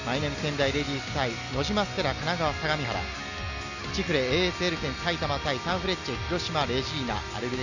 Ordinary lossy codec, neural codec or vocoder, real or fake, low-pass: none; none; real; 7.2 kHz